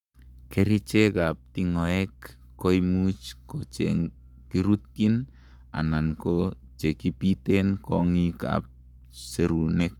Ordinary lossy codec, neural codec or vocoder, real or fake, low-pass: none; codec, 44.1 kHz, 7.8 kbps, Pupu-Codec; fake; 19.8 kHz